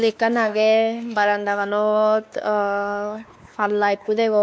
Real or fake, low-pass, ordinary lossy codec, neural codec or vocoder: fake; none; none; codec, 16 kHz, 2 kbps, X-Codec, WavLM features, trained on Multilingual LibriSpeech